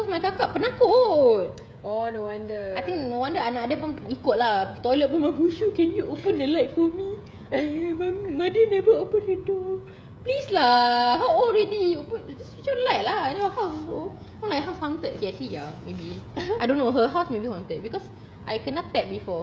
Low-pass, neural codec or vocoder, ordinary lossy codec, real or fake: none; codec, 16 kHz, 16 kbps, FreqCodec, smaller model; none; fake